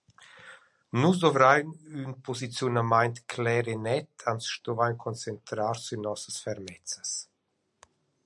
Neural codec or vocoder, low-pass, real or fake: none; 10.8 kHz; real